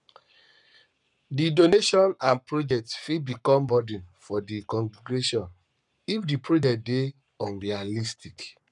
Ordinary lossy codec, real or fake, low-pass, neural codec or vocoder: none; fake; 10.8 kHz; codec, 44.1 kHz, 7.8 kbps, Pupu-Codec